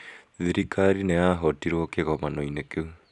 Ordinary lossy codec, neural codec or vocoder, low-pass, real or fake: none; none; 10.8 kHz; real